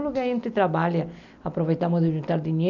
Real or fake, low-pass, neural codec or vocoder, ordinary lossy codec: real; 7.2 kHz; none; none